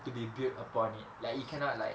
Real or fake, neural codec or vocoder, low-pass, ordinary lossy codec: real; none; none; none